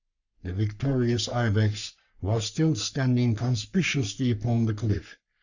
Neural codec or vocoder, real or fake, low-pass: codec, 44.1 kHz, 3.4 kbps, Pupu-Codec; fake; 7.2 kHz